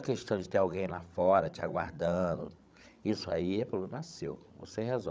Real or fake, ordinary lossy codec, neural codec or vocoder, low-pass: fake; none; codec, 16 kHz, 16 kbps, FreqCodec, larger model; none